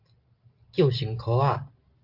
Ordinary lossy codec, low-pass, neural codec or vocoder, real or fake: Opus, 32 kbps; 5.4 kHz; none; real